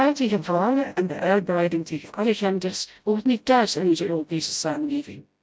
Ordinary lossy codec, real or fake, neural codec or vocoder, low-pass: none; fake; codec, 16 kHz, 0.5 kbps, FreqCodec, smaller model; none